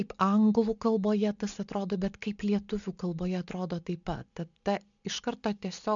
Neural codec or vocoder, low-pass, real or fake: none; 7.2 kHz; real